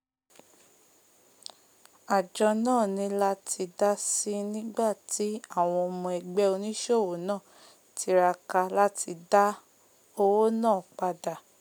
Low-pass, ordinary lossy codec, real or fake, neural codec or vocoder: none; none; real; none